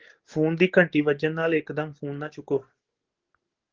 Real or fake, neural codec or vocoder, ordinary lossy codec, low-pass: fake; codec, 44.1 kHz, 7.8 kbps, DAC; Opus, 16 kbps; 7.2 kHz